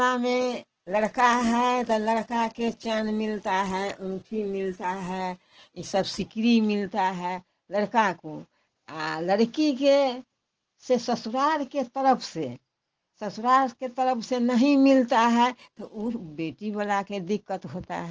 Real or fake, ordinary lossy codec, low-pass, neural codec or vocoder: real; Opus, 16 kbps; 7.2 kHz; none